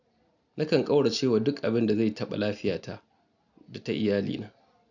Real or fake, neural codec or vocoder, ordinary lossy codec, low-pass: real; none; none; 7.2 kHz